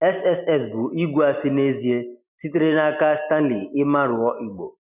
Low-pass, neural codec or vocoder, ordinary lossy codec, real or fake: 3.6 kHz; none; none; real